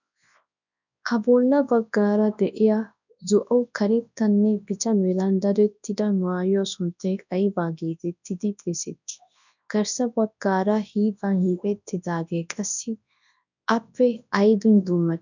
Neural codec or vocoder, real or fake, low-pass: codec, 24 kHz, 0.9 kbps, WavTokenizer, large speech release; fake; 7.2 kHz